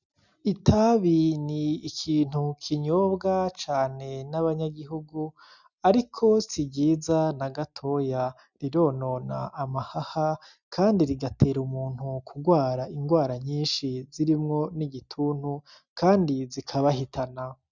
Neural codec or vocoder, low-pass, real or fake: none; 7.2 kHz; real